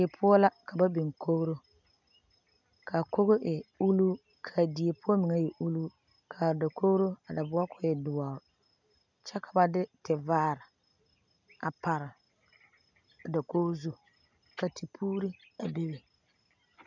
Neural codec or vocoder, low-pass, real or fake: none; 7.2 kHz; real